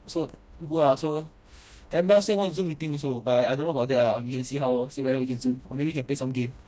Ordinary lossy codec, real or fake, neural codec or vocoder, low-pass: none; fake; codec, 16 kHz, 1 kbps, FreqCodec, smaller model; none